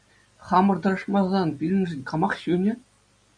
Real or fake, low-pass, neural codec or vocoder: real; 9.9 kHz; none